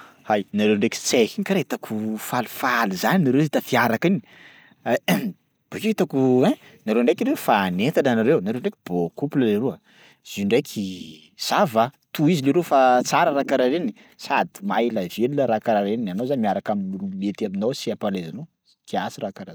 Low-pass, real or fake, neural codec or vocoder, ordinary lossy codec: none; real; none; none